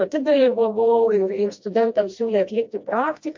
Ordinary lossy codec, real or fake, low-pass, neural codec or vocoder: MP3, 48 kbps; fake; 7.2 kHz; codec, 16 kHz, 1 kbps, FreqCodec, smaller model